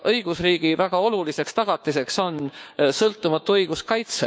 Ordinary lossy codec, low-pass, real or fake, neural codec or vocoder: none; none; fake; codec, 16 kHz, 6 kbps, DAC